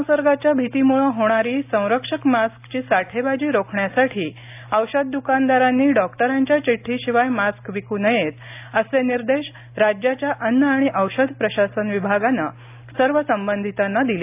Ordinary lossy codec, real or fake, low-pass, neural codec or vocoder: AAC, 32 kbps; real; 3.6 kHz; none